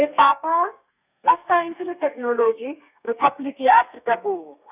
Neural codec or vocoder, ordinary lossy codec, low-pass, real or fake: codec, 32 kHz, 1.9 kbps, SNAC; none; 3.6 kHz; fake